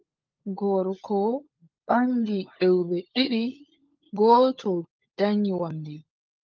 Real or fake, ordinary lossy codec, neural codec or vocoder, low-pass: fake; Opus, 24 kbps; codec, 16 kHz, 16 kbps, FunCodec, trained on LibriTTS, 50 frames a second; 7.2 kHz